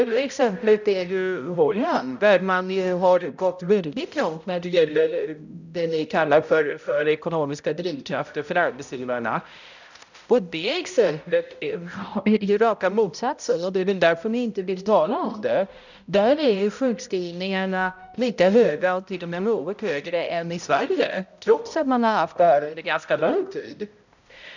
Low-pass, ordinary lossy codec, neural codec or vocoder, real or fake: 7.2 kHz; none; codec, 16 kHz, 0.5 kbps, X-Codec, HuBERT features, trained on balanced general audio; fake